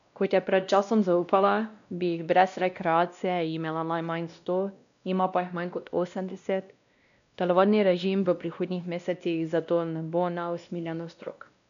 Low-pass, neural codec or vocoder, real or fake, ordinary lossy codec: 7.2 kHz; codec, 16 kHz, 1 kbps, X-Codec, WavLM features, trained on Multilingual LibriSpeech; fake; none